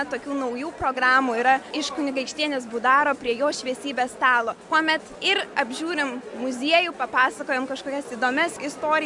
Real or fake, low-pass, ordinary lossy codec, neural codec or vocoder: real; 10.8 kHz; MP3, 64 kbps; none